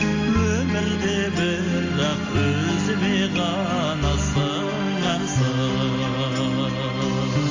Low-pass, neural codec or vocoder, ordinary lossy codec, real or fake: 7.2 kHz; none; AAC, 48 kbps; real